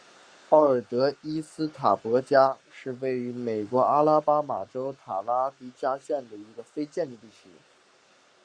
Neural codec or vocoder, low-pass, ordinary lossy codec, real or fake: codec, 44.1 kHz, 7.8 kbps, Pupu-Codec; 9.9 kHz; Opus, 64 kbps; fake